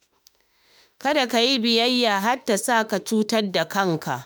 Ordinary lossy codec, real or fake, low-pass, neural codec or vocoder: none; fake; none; autoencoder, 48 kHz, 32 numbers a frame, DAC-VAE, trained on Japanese speech